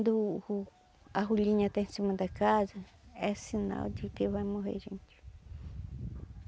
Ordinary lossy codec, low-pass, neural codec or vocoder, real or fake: none; none; none; real